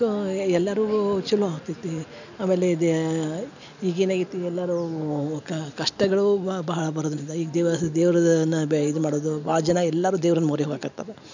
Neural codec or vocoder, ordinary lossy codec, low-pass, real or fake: none; none; 7.2 kHz; real